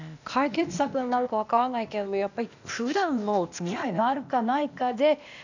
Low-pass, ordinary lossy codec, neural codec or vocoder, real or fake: 7.2 kHz; none; codec, 16 kHz, 0.8 kbps, ZipCodec; fake